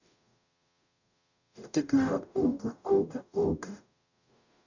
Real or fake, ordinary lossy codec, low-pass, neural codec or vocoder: fake; none; 7.2 kHz; codec, 44.1 kHz, 0.9 kbps, DAC